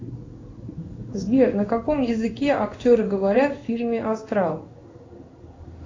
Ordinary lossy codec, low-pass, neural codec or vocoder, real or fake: AAC, 32 kbps; 7.2 kHz; codec, 16 kHz in and 24 kHz out, 1 kbps, XY-Tokenizer; fake